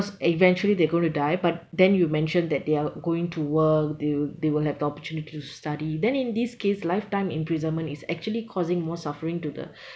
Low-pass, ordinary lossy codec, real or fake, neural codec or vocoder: none; none; real; none